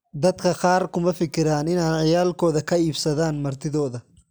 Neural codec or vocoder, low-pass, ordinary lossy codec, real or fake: none; none; none; real